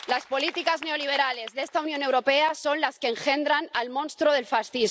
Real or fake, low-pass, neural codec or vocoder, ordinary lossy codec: real; none; none; none